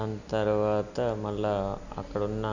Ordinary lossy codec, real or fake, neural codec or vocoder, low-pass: none; real; none; 7.2 kHz